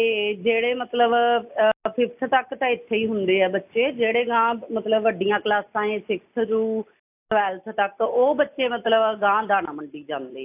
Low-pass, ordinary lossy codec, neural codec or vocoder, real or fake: 3.6 kHz; AAC, 32 kbps; none; real